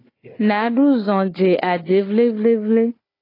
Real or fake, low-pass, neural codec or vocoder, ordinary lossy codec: fake; 5.4 kHz; codec, 16 kHz, 4 kbps, FunCodec, trained on Chinese and English, 50 frames a second; AAC, 24 kbps